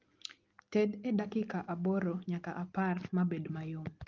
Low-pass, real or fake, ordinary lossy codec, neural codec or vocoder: 7.2 kHz; real; Opus, 32 kbps; none